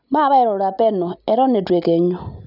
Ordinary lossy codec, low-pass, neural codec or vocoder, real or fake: none; 5.4 kHz; none; real